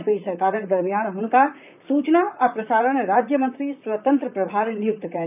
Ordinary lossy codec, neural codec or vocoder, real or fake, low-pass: none; vocoder, 44.1 kHz, 128 mel bands, Pupu-Vocoder; fake; 3.6 kHz